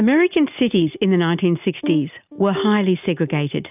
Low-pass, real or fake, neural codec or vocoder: 3.6 kHz; real; none